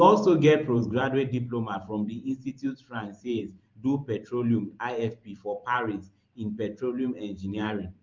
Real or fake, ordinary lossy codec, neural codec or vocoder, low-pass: fake; Opus, 24 kbps; vocoder, 44.1 kHz, 128 mel bands every 512 samples, BigVGAN v2; 7.2 kHz